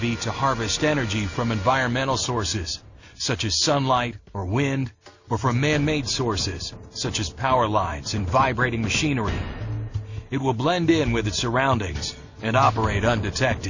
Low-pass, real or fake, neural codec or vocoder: 7.2 kHz; real; none